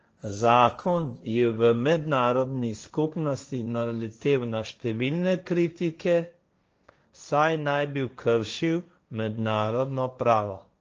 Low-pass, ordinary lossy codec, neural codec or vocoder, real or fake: 7.2 kHz; Opus, 24 kbps; codec, 16 kHz, 1.1 kbps, Voila-Tokenizer; fake